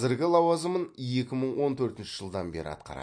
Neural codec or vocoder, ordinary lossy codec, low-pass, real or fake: none; MP3, 48 kbps; 9.9 kHz; real